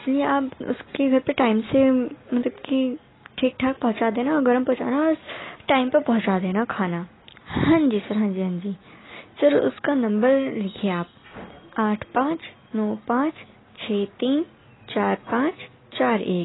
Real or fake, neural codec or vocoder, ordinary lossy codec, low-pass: real; none; AAC, 16 kbps; 7.2 kHz